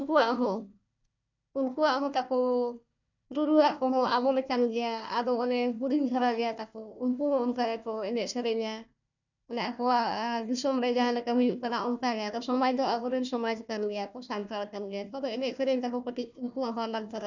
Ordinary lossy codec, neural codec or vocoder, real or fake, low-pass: none; codec, 16 kHz, 1 kbps, FunCodec, trained on Chinese and English, 50 frames a second; fake; 7.2 kHz